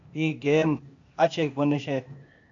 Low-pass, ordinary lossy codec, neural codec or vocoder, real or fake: 7.2 kHz; AAC, 64 kbps; codec, 16 kHz, 0.8 kbps, ZipCodec; fake